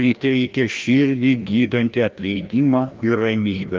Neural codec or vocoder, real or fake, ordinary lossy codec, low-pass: codec, 16 kHz, 1 kbps, FreqCodec, larger model; fake; Opus, 32 kbps; 7.2 kHz